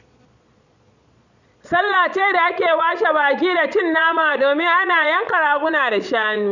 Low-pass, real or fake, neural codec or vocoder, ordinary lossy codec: 7.2 kHz; fake; vocoder, 22.05 kHz, 80 mel bands, Vocos; none